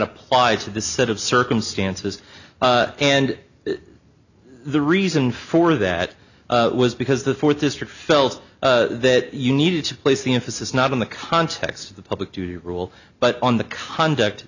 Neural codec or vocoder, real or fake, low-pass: none; real; 7.2 kHz